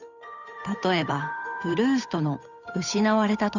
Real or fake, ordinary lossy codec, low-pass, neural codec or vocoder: fake; none; 7.2 kHz; codec, 16 kHz, 8 kbps, FunCodec, trained on Chinese and English, 25 frames a second